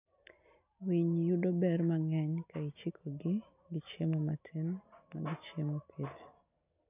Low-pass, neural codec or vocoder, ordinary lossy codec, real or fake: 3.6 kHz; none; none; real